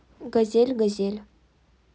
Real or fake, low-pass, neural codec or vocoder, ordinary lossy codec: real; none; none; none